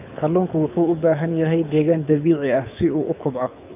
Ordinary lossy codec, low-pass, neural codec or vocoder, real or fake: none; 3.6 kHz; codec, 24 kHz, 6 kbps, HILCodec; fake